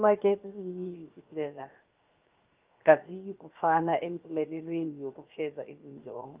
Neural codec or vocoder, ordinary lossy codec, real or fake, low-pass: codec, 16 kHz, 0.7 kbps, FocalCodec; Opus, 24 kbps; fake; 3.6 kHz